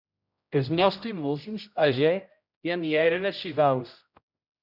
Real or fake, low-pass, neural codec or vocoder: fake; 5.4 kHz; codec, 16 kHz, 0.5 kbps, X-Codec, HuBERT features, trained on general audio